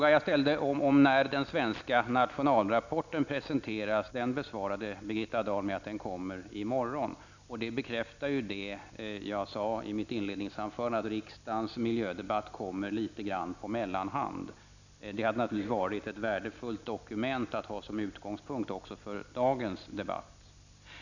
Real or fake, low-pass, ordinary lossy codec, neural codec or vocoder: real; 7.2 kHz; none; none